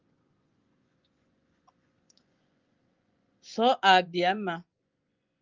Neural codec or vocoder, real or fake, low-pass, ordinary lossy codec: vocoder, 24 kHz, 100 mel bands, Vocos; fake; 7.2 kHz; Opus, 24 kbps